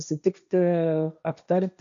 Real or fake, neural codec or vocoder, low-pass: fake; codec, 16 kHz, 1.1 kbps, Voila-Tokenizer; 7.2 kHz